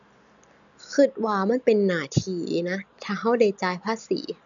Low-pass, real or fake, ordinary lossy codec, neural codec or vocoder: 7.2 kHz; real; none; none